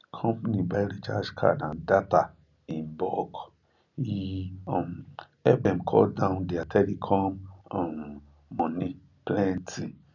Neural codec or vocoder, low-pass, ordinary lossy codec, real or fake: none; 7.2 kHz; none; real